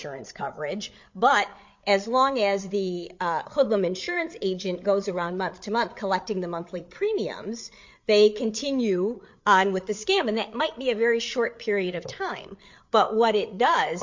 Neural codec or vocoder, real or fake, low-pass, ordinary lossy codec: codec, 16 kHz, 8 kbps, FreqCodec, larger model; fake; 7.2 kHz; MP3, 48 kbps